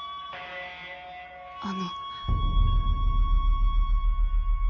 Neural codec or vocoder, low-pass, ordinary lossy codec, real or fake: none; 7.2 kHz; AAC, 48 kbps; real